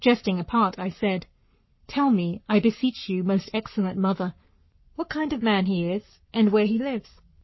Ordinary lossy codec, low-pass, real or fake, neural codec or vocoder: MP3, 24 kbps; 7.2 kHz; fake; codec, 44.1 kHz, 7.8 kbps, Pupu-Codec